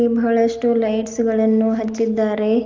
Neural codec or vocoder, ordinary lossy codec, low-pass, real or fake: none; Opus, 32 kbps; 7.2 kHz; real